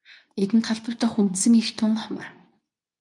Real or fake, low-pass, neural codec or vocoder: fake; 10.8 kHz; codec, 24 kHz, 0.9 kbps, WavTokenizer, medium speech release version 2